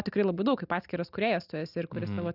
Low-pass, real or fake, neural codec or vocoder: 5.4 kHz; real; none